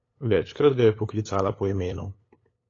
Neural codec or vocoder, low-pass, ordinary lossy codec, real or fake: codec, 16 kHz, 8 kbps, FunCodec, trained on LibriTTS, 25 frames a second; 7.2 kHz; AAC, 32 kbps; fake